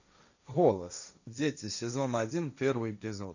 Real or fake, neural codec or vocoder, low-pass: fake; codec, 16 kHz, 1.1 kbps, Voila-Tokenizer; 7.2 kHz